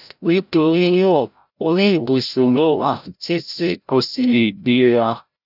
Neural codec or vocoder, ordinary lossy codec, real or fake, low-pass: codec, 16 kHz, 0.5 kbps, FreqCodec, larger model; none; fake; 5.4 kHz